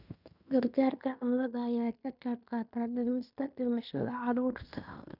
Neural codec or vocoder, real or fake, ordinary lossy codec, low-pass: codec, 16 kHz in and 24 kHz out, 0.9 kbps, LongCat-Audio-Codec, fine tuned four codebook decoder; fake; none; 5.4 kHz